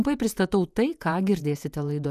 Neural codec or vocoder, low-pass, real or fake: vocoder, 48 kHz, 128 mel bands, Vocos; 14.4 kHz; fake